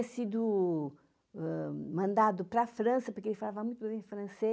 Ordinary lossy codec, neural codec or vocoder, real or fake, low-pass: none; none; real; none